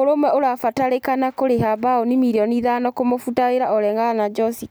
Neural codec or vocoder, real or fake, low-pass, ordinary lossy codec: none; real; none; none